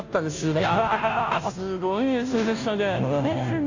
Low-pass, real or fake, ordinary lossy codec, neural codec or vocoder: 7.2 kHz; fake; AAC, 48 kbps; codec, 16 kHz, 0.5 kbps, FunCodec, trained on Chinese and English, 25 frames a second